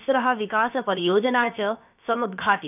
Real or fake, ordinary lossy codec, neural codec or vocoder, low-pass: fake; none; codec, 16 kHz, about 1 kbps, DyCAST, with the encoder's durations; 3.6 kHz